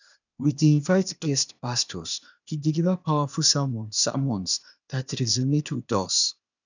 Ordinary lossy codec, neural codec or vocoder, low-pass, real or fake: none; codec, 16 kHz, 0.8 kbps, ZipCodec; 7.2 kHz; fake